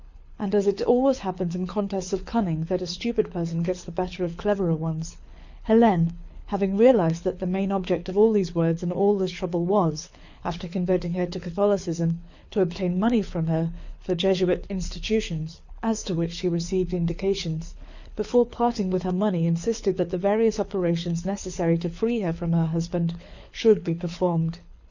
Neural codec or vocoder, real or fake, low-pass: codec, 24 kHz, 6 kbps, HILCodec; fake; 7.2 kHz